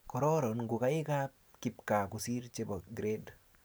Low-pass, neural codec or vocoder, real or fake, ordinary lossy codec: none; none; real; none